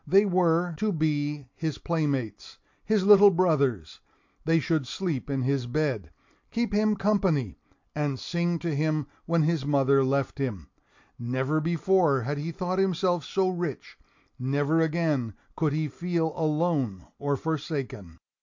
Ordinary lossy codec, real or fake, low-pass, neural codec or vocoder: MP3, 48 kbps; real; 7.2 kHz; none